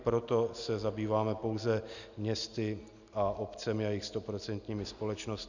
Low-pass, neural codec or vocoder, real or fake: 7.2 kHz; none; real